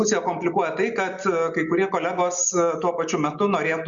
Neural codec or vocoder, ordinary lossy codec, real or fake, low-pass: none; Opus, 64 kbps; real; 7.2 kHz